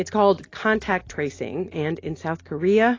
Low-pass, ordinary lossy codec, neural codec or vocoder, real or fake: 7.2 kHz; AAC, 32 kbps; none; real